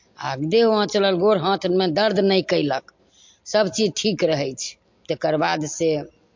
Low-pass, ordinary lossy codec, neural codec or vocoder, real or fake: 7.2 kHz; MP3, 48 kbps; none; real